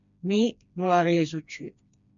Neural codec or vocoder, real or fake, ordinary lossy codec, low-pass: codec, 16 kHz, 2 kbps, FreqCodec, smaller model; fake; MP3, 64 kbps; 7.2 kHz